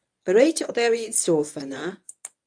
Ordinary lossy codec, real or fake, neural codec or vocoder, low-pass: AAC, 64 kbps; fake; codec, 24 kHz, 0.9 kbps, WavTokenizer, medium speech release version 1; 9.9 kHz